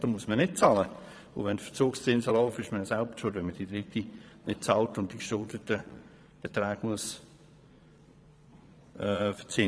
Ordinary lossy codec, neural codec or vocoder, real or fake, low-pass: none; vocoder, 22.05 kHz, 80 mel bands, Vocos; fake; none